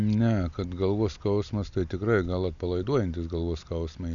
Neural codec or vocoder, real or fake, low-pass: none; real; 7.2 kHz